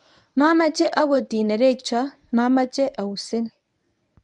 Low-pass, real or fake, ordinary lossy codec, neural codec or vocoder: 10.8 kHz; fake; none; codec, 24 kHz, 0.9 kbps, WavTokenizer, medium speech release version 1